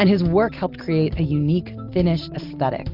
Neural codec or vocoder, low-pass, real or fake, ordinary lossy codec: none; 5.4 kHz; real; Opus, 32 kbps